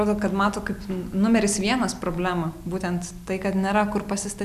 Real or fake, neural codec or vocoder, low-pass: real; none; 14.4 kHz